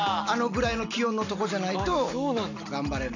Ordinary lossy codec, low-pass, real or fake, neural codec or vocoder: none; 7.2 kHz; real; none